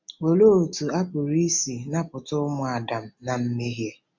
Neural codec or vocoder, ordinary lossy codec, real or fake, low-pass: none; MP3, 64 kbps; real; 7.2 kHz